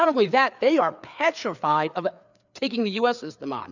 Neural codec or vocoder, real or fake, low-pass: codec, 16 kHz, 4 kbps, FreqCodec, larger model; fake; 7.2 kHz